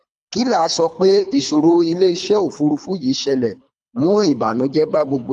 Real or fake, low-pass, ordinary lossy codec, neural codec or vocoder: fake; none; none; codec, 24 kHz, 3 kbps, HILCodec